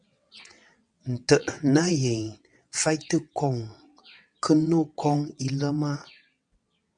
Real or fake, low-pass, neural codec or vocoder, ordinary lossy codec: fake; 9.9 kHz; vocoder, 22.05 kHz, 80 mel bands, WaveNeXt; MP3, 96 kbps